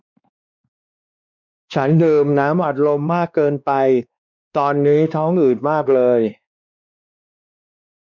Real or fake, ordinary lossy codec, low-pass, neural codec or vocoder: fake; none; 7.2 kHz; codec, 16 kHz, 2 kbps, X-Codec, WavLM features, trained on Multilingual LibriSpeech